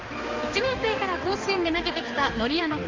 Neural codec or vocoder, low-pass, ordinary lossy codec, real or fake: codec, 16 kHz, 2 kbps, X-Codec, HuBERT features, trained on balanced general audio; 7.2 kHz; Opus, 32 kbps; fake